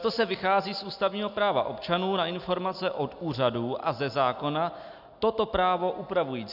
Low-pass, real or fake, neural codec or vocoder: 5.4 kHz; real; none